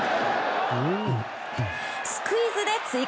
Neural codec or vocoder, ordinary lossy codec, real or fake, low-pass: none; none; real; none